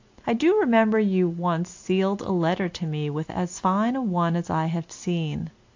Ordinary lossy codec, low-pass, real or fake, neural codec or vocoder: AAC, 48 kbps; 7.2 kHz; real; none